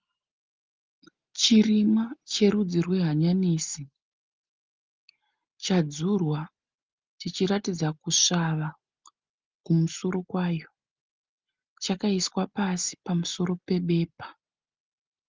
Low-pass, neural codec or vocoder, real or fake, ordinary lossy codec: 7.2 kHz; none; real; Opus, 32 kbps